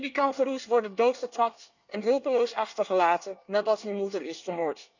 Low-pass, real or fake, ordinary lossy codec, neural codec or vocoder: 7.2 kHz; fake; none; codec, 24 kHz, 1 kbps, SNAC